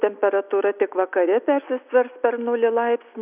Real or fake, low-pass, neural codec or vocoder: real; 3.6 kHz; none